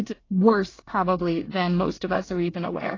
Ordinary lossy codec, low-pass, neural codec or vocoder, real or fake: AAC, 32 kbps; 7.2 kHz; codec, 24 kHz, 1 kbps, SNAC; fake